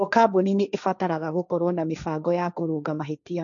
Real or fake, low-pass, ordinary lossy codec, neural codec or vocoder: fake; 7.2 kHz; none; codec, 16 kHz, 1.1 kbps, Voila-Tokenizer